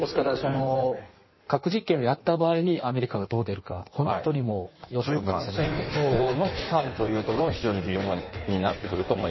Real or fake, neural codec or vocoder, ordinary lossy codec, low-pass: fake; codec, 16 kHz in and 24 kHz out, 1.1 kbps, FireRedTTS-2 codec; MP3, 24 kbps; 7.2 kHz